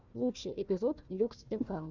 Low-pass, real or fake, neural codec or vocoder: 7.2 kHz; fake; codec, 16 kHz, 1 kbps, FunCodec, trained on Chinese and English, 50 frames a second